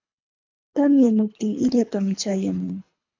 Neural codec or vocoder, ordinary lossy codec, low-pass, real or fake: codec, 24 kHz, 3 kbps, HILCodec; MP3, 64 kbps; 7.2 kHz; fake